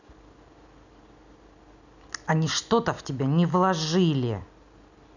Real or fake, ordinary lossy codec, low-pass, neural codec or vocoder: real; none; 7.2 kHz; none